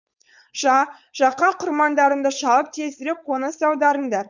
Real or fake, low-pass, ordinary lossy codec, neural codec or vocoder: fake; 7.2 kHz; none; codec, 16 kHz, 4.8 kbps, FACodec